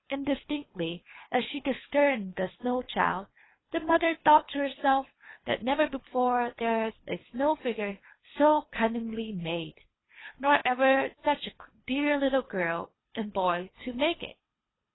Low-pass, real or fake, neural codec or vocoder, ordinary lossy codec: 7.2 kHz; fake; codec, 24 kHz, 3 kbps, HILCodec; AAC, 16 kbps